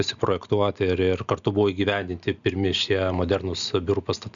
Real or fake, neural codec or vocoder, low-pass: real; none; 7.2 kHz